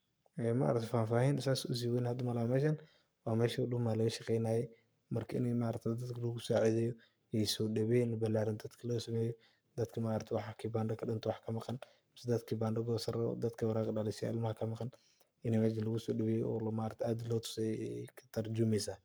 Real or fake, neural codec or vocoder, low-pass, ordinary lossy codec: fake; codec, 44.1 kHz, 7.8 kbps, Pupu-Codec; none; none